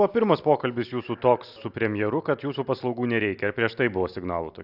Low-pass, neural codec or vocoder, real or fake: 5.4 kHz; none; real